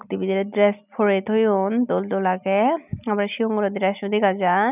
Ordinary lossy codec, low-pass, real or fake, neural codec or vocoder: none; 3.6 kHz; real; none